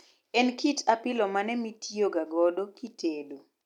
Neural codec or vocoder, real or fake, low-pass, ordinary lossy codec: none; real; 19.8 kHz; none